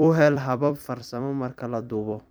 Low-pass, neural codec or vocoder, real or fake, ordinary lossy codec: none; vocoder, 44.1 kHz, 128 mel bands every 256 samples, BigVGAN v2; fake; none